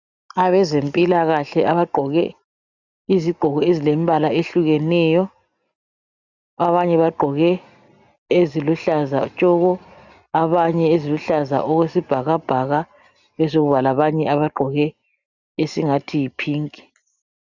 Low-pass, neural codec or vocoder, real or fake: 7.2 kHz; none; real